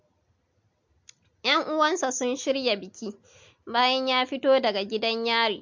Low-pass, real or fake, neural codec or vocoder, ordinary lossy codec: 7.2 kHz; real; none; MP3, 48 kbps